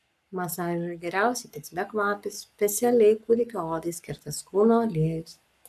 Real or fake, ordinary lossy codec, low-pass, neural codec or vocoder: fake; AAC, 96 kbps; 14.4 kHz; codec, 44.1 kHz, 7.8 kbps, Pupu-Codec